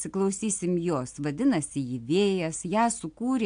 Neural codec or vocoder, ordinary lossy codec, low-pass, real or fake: none; AAC, 64 kbps; 9.9 kHz; real